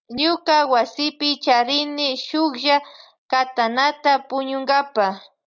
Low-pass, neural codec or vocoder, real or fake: 7.2 kHz; none; real